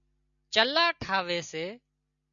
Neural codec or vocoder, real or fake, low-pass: none; real; 7.2 kHz